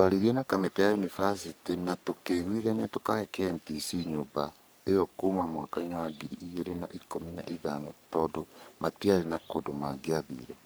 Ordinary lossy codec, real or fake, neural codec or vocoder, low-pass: none; fake; codec, 44.1 kHz, 3.4 kbps, Pupu-Codec; none